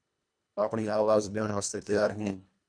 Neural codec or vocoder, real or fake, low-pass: codec, 24 kHz, 1.5 kbps, HILCodec; fake; 9.9 kHz